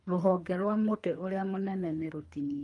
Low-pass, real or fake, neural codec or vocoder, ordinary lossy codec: 10.8 kHz; fake; codec, 24 kHz, 3 kbps, HILCodec; Opus, 32 kbps